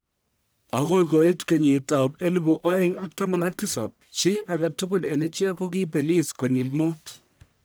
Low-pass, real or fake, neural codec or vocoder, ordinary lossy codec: none; fake; codec, 44.1 kHz, 1.7 kbps, Pupu-Codec; none